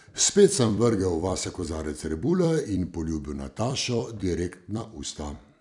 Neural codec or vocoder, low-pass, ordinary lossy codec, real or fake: vocoder, 44.1 kHz, 128 mel bands every 256 samples, BigVGAN v2; 10.8 kHz; none; fake